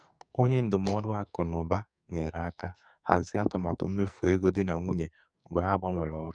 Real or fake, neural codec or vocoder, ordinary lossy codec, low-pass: fake; codec, 44.1 kHz, 2.6 kbps, SNAC; none; 9.9 kHz